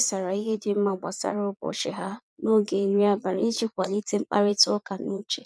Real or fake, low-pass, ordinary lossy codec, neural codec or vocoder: fake; none; none; vocoder, 22.05 kHz, 80 mel bands, Vocos